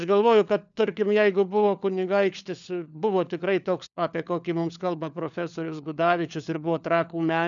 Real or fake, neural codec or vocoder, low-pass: fake; codec, 16 kHz, 4 kbps, FunCodec, trained on LibriTTS, 50 frames a second; 7.2 kHz